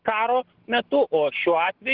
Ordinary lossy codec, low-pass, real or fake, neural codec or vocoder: Opus, 16 kbps; 5.4 kHz; real; none